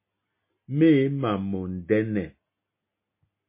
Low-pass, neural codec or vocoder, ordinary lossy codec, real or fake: 3.6 kHz; none; MP3, 24 kbps; real